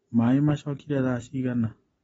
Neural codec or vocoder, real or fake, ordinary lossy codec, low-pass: none; real; AAC, 24 kbps; 19.8 kHz